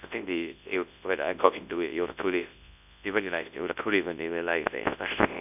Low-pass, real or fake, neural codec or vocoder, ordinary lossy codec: 3.6 kHz; fake; codec, 24 kHz, 0.9 kbps, WavTokenizer, large speech release; none